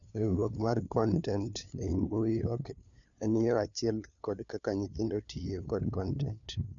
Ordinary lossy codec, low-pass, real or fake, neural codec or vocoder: none; 7.2 kHz; fake; codec, 16 kHz, 2 kbps, FunCodec, trained on LibriTTS, 25 frames a second